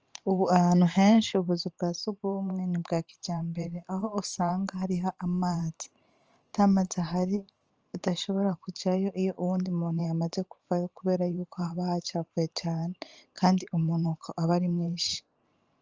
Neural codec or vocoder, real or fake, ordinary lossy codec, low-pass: vocoder, 44.1 kHz, 128 mel bands every 512 samples, BigVGAN v2; fake; Opus, 24 kbps; 7.2 kHz